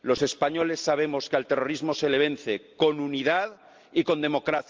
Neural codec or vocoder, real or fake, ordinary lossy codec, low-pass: none; real; Opus, 24 kbps; 7.2 kHz